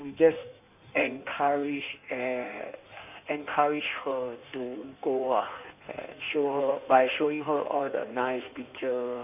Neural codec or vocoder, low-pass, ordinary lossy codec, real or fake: codec, 16 kHz in and 24 kHz out, 1.1 kbps, FireRedTTS-2 codec; 3.6 kHz; none; fake